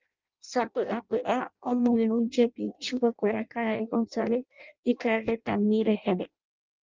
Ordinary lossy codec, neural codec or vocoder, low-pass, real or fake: Opus, 32 kbps; codec, 16 kHz in and 24 kHz out, 0.6 kbps, FireRedTTS-2 codec; 7.2 kHz; fake